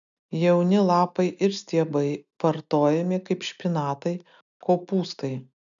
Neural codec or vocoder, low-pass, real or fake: none; 7.2 kHz; real